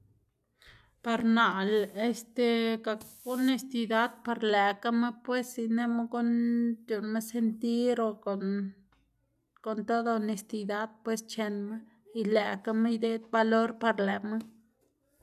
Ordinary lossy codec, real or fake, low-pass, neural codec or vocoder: none; real; 14.4 kHz; none